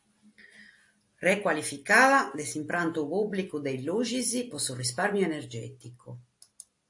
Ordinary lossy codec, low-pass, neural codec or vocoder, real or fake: AAC, 64 kbps; 10.8 kHz; none; real